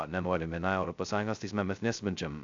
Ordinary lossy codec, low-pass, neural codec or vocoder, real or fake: AAC, 64 kbps; 7.2 kHz; codec, 16 kHz, 0.2 kbps, FocalCodec; fake